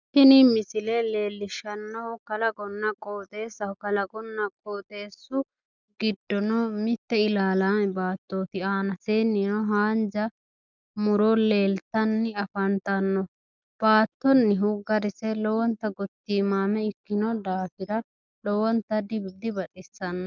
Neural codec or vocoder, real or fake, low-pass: none; real; 7.2 kHz